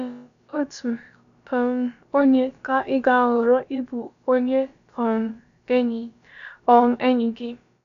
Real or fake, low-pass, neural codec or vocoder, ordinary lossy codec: fake; 7.2 kHz; codec, 16 kHz, about 1 kbps, DyCAST, with the encoder's durations; none